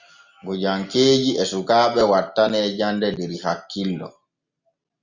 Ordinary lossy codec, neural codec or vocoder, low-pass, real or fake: Opus, 64 kbps; none; 7.2 kHz; real